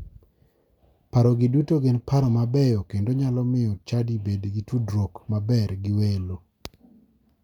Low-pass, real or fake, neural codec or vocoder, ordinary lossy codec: 19.8 kHz; real; none; none